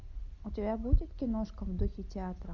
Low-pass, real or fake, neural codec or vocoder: 7.2 kHz; real; none